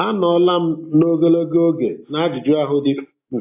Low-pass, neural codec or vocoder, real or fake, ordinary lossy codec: 3.6 kHz; none; real; AAC, 32 kbps